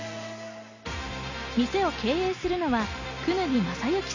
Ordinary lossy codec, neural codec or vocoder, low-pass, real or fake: none; none; 7.2 kHz; real